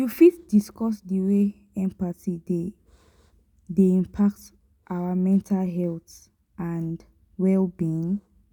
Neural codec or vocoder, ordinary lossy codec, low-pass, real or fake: none; none; 19.8 kHz; real